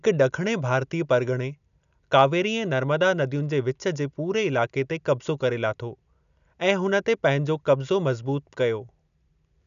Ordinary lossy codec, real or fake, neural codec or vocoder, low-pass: none; real; none; 7.2 kHz